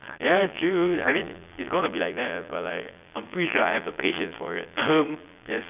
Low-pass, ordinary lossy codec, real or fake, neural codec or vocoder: 3.6 kHz; none; fake; vocoder, 22.05 kHz, 80 mel bands, Vocos